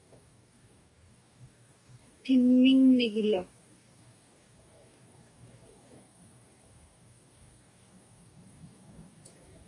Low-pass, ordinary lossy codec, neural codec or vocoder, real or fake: 10.8 kHz; AAC, 64 kbps; codec, 44.1 kHz, 2.6 kbps, DAC; fake